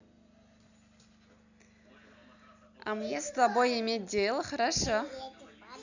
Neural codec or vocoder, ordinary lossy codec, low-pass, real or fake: none; none; 7.2 kHz; real